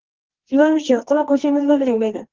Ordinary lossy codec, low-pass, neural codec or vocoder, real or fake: Opus, 16 kbps; 7.2 kHz; codec, 24 kHz, 0.9 kbps, WavTokenizer, medium music audio release; fake